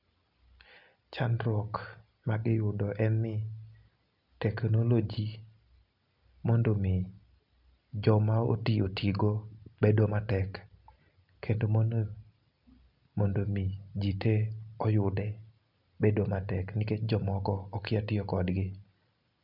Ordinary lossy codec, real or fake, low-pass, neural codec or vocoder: none; real; 5.4 kHz; none